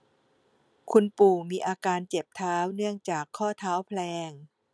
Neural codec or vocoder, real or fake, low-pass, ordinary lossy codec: none; real; none; none